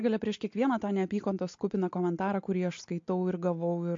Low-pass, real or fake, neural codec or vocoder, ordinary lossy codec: 7.2 kHz; real; none; MP3, 48 kbps